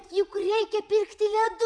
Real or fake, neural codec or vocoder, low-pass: fake; vocoder, 22.05 kHz, 80 mel bands, Vocos; 9.9 kHz